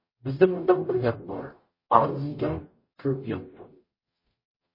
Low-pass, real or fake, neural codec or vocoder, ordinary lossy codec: 5.4 kHz; fake; codec, 44.1 kHz, 0.9 kbps, DAC; MP3, 24 kbps